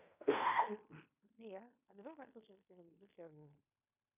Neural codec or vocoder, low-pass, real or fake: codec, 16 kHz in and 24 kHz out, 0.9 kbps, LongCat-Audio-Codec, fine tuned four codebook decoder; 3.6 kHz; fake